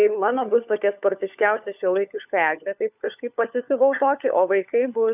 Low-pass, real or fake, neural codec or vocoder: 3.6 kHz; fake; codec, 16 kHz, 4 kbps, FunCodec, trained on LibriTTS, 50 frames a second